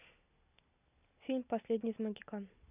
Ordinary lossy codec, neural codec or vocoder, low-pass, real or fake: none; none; 3.6 kHz; real